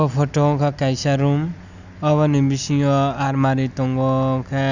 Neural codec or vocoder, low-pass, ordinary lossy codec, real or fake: none; 7.2 kHz; none; real